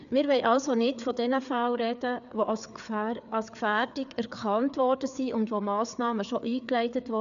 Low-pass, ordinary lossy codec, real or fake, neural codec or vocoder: 7.2 kHz; none; fake; codec, 16 kHz, 4 kbps, FreqCodec, larger model